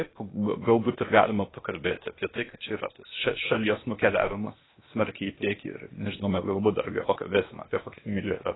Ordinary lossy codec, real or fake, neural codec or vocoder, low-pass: AAC, 16 kbps; fake; codec, 16 kHz, 0.8 kbps, ZipCodec; 7.2 kHz